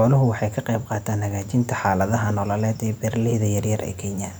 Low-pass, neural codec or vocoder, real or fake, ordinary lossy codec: none; none; real; none